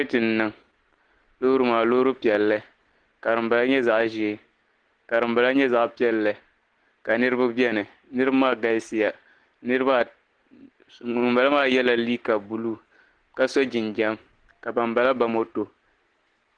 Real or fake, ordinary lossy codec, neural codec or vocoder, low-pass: real; Opus, 16 kbps; none; 9.9 kHz